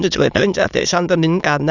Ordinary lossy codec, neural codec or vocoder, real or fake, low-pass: none; autoencoder, 22.05 kHz, a latent of 192 numbers a frame, VITS, trained on many speakers; fake; 7.2 kHz